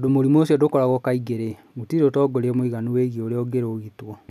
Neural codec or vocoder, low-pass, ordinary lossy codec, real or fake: none; 14.4 kHz; none; real